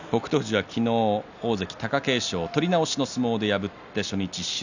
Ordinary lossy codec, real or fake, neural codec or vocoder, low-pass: none; real; none; 7.2 kHz